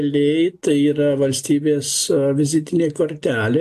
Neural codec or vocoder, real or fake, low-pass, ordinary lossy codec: none; real; 14.4 kHz; AAC, 96 kbps